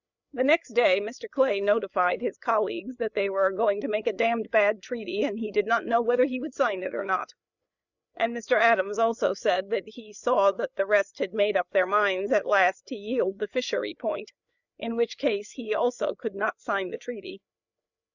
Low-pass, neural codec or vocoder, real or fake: 7.2 kHz; codec, 16 kHz, 8 kbps, FreqCodec, larger model; fake